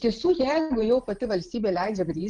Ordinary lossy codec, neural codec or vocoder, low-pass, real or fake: Opus, 24 kbps; vocoder, 44.1 kHz, 128 mel bands every 512 samples, BigVGAN v2; 10.8 kHz; fake